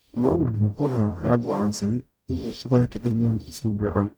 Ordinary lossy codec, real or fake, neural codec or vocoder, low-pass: none; fake; codec, 44.1 kHz, 0.9 kbps, DAC; none